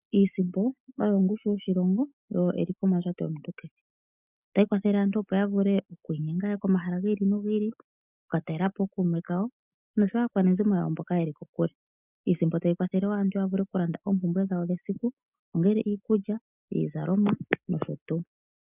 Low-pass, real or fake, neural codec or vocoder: 3.6 kHz; real; none